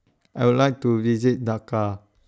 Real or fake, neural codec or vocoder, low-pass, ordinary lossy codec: real; none; none; none